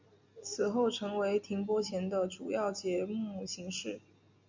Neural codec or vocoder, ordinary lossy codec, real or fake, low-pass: none; MP3, 64 kbps; real; 7.2 kHz